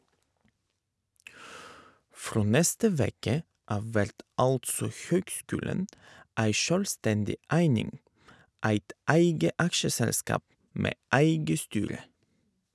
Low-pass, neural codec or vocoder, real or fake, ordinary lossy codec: none; none; real; none